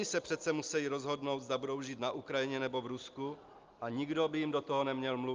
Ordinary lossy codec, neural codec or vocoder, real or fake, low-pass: Opus, 32 kbps; none; real; 7.2 kHz